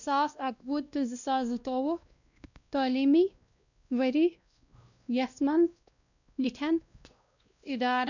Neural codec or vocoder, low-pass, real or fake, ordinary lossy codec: codec, 16 kHz, 1 kbps, X-Codec, WavLM features, trained on Multilingual LibriSpeech; 7.2 kHz; fake; none